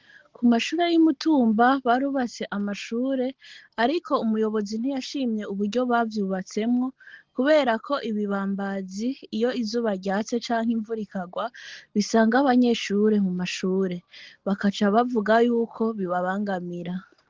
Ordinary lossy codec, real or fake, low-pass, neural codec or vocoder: Opus, 16 kbps; real; 7.2 kHz; none